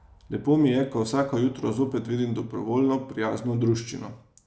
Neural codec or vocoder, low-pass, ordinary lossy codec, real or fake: none; none; none; real